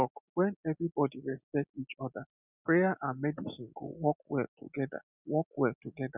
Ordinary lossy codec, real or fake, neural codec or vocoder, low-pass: none; real; none; 3.6 kHz